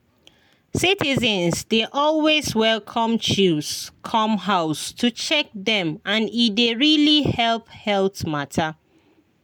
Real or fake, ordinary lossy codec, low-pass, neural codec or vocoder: real; none; none; none